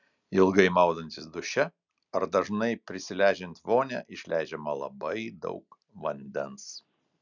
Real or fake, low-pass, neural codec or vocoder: real; 7.2 kHz; none